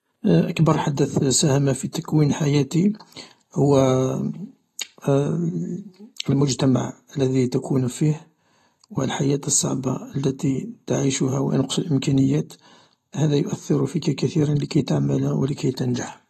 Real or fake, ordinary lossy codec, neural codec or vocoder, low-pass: real; AAC, 32 kbps; none; 19.8 kHz